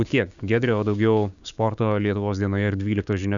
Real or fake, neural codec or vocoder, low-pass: fake; codec, 16 kHz, 6 kbps, DAC; 7.2 kHz